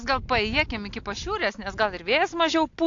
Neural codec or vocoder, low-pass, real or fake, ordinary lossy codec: none; 7.2 kHz; real; AAC, 48 kbps